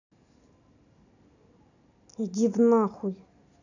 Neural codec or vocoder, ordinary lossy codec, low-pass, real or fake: none; none; 7.2 kHz; real